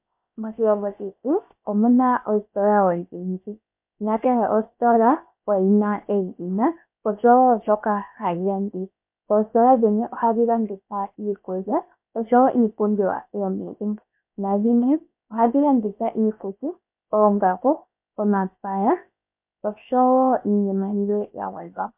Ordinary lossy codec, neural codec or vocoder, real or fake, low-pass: MP3, 32 kbps; codec, 16 kHz, 0.7 kbps, FocalCodec; fake; 3.6 kHz